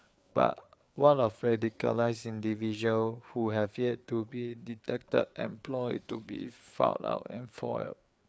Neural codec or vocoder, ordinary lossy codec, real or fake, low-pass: codec, 16 kHz, 4 kbps, FreqCodec, larger model; none; fake; none